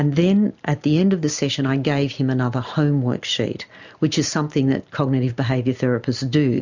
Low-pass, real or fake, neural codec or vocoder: 7.2 kHz; real; none